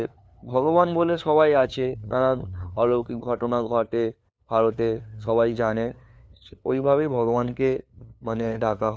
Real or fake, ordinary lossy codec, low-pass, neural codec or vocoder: fake; none; none; codec, 16 kHz, 2 kbps, FunCodec, trained on LibriTTS, 25 frames a second